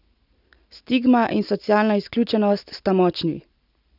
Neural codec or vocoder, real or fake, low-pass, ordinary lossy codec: none; real; 5.4 kHz; none